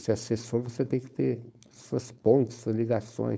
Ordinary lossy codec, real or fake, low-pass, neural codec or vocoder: none; fake; none; codec, 16 kHz, 4.8 kbps, FACodec